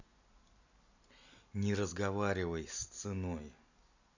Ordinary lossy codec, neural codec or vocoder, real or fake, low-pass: none; none; real; 7.2 kHz